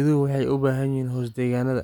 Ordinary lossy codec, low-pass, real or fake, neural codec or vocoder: none; 19.8 kHz; real; none